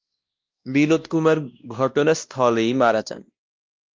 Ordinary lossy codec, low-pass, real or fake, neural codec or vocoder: Opus, 24 kbps; 7.2 kHz; fake; codec, 16 kHz, 1 kbps, X-Codec, WavLM features, trained on Multilingual LibriSpeech